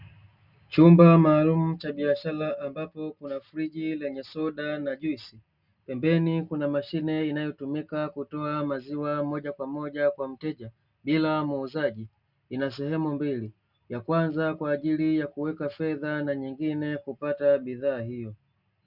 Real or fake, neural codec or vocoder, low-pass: real; none; 5.4 kHz